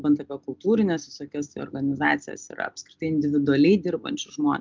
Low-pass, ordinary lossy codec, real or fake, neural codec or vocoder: 7.2 kHz; Opus, 24 kbps; real; none